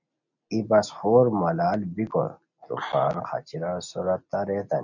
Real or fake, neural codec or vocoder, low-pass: real; none; 7.2 kHz